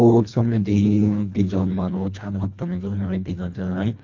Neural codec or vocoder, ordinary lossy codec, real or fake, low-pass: codec, 24 kHz, 1.5 kbps, HILCodec; MP3, 64 kbps; fake; 7.2 kHz